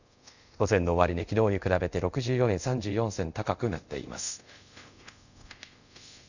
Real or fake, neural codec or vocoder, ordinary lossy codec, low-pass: fake; codec, 24 kHz, 0.5 kbps, DualCodec; none; 7.2 kHz